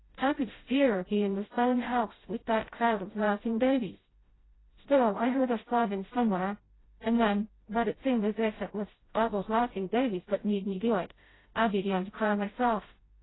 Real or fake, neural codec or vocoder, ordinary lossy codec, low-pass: fake; codec, 16 kHz, 0.5 kbps, FreqCodec, smaller model; AAC, 16 kbps; 7.2 kHz